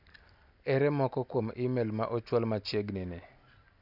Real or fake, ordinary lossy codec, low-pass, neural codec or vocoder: real; none; 5.4 kHz; none